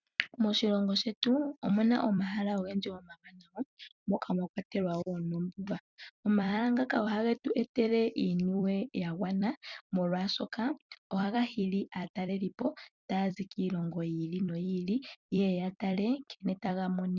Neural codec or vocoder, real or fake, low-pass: vocoder, 44.1 kHz, 128 mel bands every 256 samples, BigVGAN v2; fake; 7.2 kHz